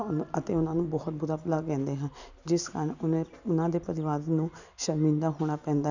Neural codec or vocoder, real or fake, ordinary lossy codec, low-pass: none; real; none; 7.2 kHz